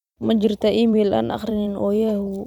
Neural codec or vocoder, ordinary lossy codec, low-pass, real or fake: vocoder, 44.1 kHz, 128 mel bands every 256 samples, BigVGAN v2; none; 19.8 kHz; fake